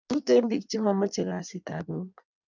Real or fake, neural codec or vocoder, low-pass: fake; codec, 16 kHz in and 24 kHz out, 1.1 kbps, FireRedTTS-2 codec; 7.2 kHz